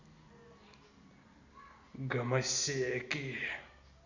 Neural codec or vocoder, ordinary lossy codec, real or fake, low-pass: none; Opus, 64 kbps; real; 7.2 kHz